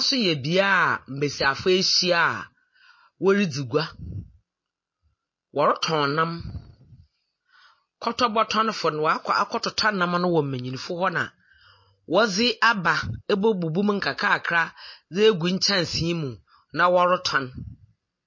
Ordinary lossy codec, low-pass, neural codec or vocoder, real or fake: MP3, 32 kbps; 7.2 kHz; none; real